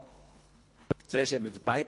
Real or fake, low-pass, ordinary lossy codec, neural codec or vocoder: fake; 10.8 kHz; MP3, 48 kbps; codec, 24 kHz, 1.5 kbps, HILCodec